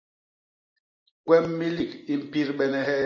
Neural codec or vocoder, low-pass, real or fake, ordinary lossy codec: none; 7.2 kHz; real; MP3, 32 kbps